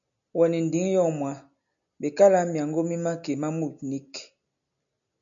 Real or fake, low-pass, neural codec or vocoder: real; 7.2 kHz; none